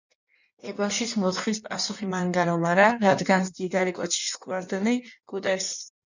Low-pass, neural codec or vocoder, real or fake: 7.2 kHz; codec, 16 kHz in and 24 kHz out, 1.1 kbps, FireRedTTS-2 codec; fake